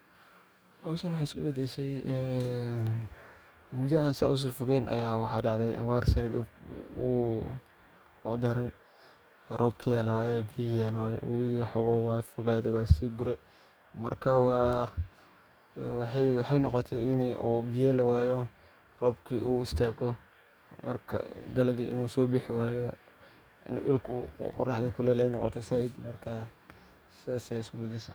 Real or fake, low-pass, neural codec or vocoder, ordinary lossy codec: fake; none; codec, 44.1 kHz, 2.6 kbps, DAC; none